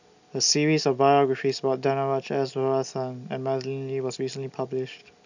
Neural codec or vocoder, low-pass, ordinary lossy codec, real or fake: none; 7.2 kHz; none; real